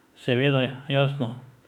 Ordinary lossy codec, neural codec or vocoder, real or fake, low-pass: none; autoencoder, 48 kHz, 32 numbers a frame, DAC-VAE, trained on Japanese speech; fake; 19.8 kHz